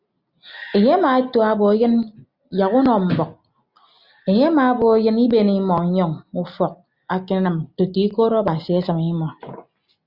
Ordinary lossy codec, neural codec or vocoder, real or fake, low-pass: Opus, 64 kbps; none; real; 5.4 kHz